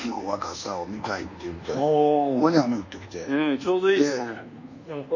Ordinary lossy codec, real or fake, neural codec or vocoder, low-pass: none; fake; codec, 24 kHz, 1.2 kbps, DualCodec; 7.2 kHz